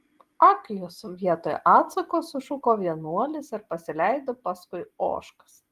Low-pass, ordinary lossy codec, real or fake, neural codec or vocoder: 14.4 kHz; Opus, 24 kbps; real; none